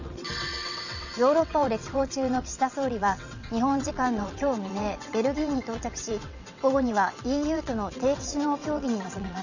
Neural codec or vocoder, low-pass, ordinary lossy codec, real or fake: vocoder, 22.05 kHz, 80 mel bands, WaveNeXt; 7.2 kHz; none; fake